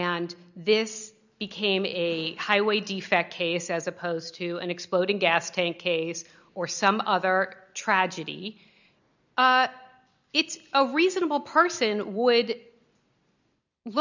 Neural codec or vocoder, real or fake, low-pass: none; real; 7.2 kHz